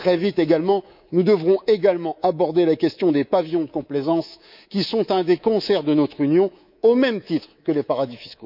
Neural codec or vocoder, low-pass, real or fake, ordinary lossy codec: codec, 24 kHz, 3.1 kbps, DualCodec; 5.4 kHz; fake; none